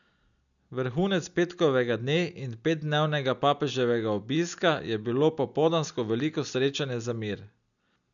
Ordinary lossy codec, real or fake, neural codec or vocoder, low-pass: none; real; none; 7.2 kHz